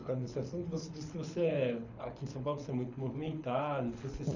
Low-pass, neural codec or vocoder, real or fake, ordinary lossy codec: 7.2 kHz; codec, 24 kHz, 6 kbps, HILCodec; fake; none